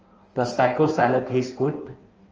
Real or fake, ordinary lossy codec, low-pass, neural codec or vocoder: fake; Opus, 24 kbps; 7.2 kHz; codec, 16 kHz in and 24 kHz out, 1.1 kbps, FireRedTTS-2 codec